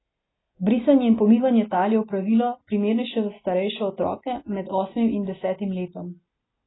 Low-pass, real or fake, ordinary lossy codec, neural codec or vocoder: 7.2 kHz; real; AAC, 16 kbps; none